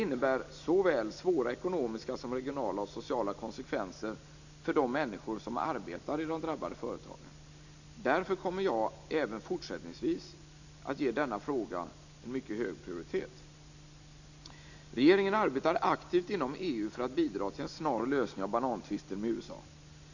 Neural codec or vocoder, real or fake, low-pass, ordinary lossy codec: none; real; 7.2 kHz; none